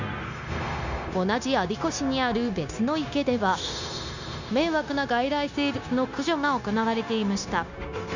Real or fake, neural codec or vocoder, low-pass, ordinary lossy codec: fake; codec, 16 kHz, 0.9 kbps, LongCat-Audio-Codec; 7.2 kHz; none